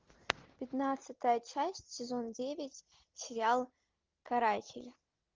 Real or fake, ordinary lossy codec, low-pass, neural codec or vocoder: real; Opus, 24 kbps; 7.2 kHz; none